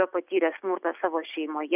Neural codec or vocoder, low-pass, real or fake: none; 3.6 kHz; real